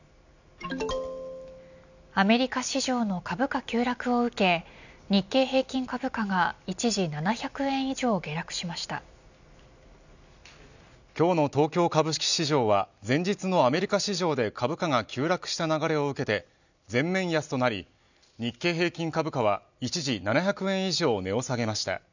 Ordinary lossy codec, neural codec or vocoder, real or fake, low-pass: none; none; real; 7.2 kHz